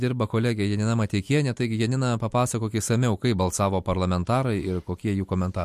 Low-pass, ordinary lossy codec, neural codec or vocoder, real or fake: 14.4 kHz; MP3, 64 kbps; vocoder, 44.1 kHz, 128 mel bands every 256 samples, BigVGAN v2; fake